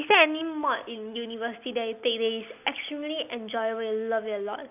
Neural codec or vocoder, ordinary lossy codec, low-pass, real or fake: none; none; 3.6 kHz; real